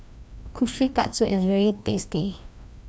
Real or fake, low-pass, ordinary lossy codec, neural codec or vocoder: fake; none; none; codec, 16 kHz, 1 kbps, FreqCodec, larger model